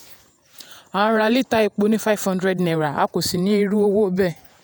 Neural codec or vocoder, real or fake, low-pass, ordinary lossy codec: vocoder, 48 kHz, 128 mel bands, Vocos; fake; none; none